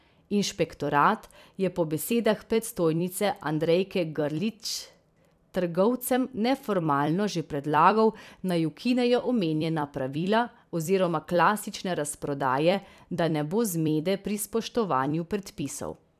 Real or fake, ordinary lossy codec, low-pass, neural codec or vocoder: fake; none; 14.4 kHz; vocoder, 44.1 kHz, 128 mel bands every 256 samples, BigVGAN v2